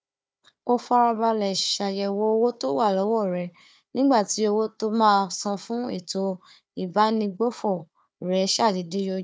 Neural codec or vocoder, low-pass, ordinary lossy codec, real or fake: codec, 16 kHz, 4 kbps, FunCodec, trained on Chinese and English, 50 frames a second; none; none; fake